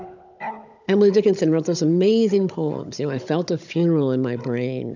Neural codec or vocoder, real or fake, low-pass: codec, 16 kHz, 16 kbps, FunCodec, trained on Chinese and English, 50 frames a second; fake; 7.2 kHz